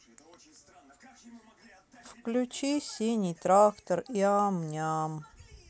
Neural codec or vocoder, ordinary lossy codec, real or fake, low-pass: none; none; real; none